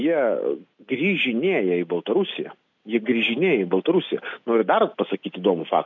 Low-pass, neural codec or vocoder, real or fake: 7.2 kHz; none; real